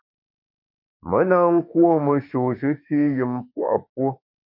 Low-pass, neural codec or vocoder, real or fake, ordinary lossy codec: 5.4 kHz; autoencoder, 48 kHz, 32 numbers a frame, DAC-VAE, trained on Japanese speech; fake; MP3, 32 kbps